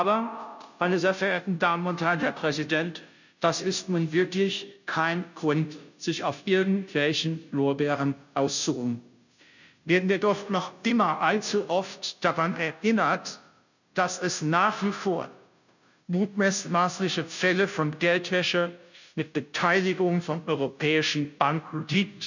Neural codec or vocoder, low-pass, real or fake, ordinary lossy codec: codec, 16 kHz, 0.5 kbps, FunCodec, trained on Chinese and English, 25 frames a second; 7.2 kHz; fake; none